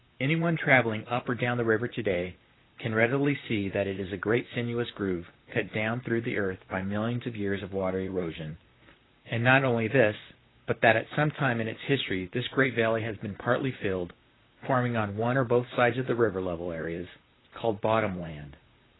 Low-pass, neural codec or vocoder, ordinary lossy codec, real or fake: 7.2 kHz; vocoder, 44.1 kHz, 128 mel bands, Pupu-Vocoder; AAC, 16 kbps; fake